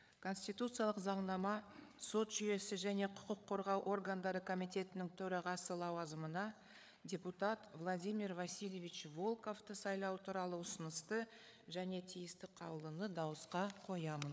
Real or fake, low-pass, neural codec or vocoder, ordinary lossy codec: fake; none; codec, 16 kHz, 8 kbps, FreqCodec, larger model; none